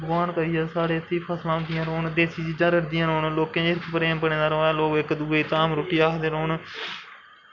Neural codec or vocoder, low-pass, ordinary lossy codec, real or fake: none; 7.2 kHz; none; real